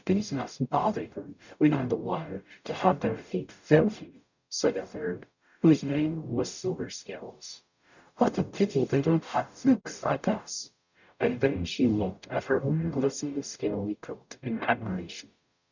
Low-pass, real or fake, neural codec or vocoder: 7.2 kHz; fake; codec, 44.1 kHz, 0.9 kbps, DAC